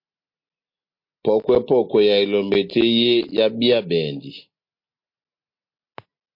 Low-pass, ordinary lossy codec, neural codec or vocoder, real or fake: 5.4 kHz; MP3, 32 kbps; none; real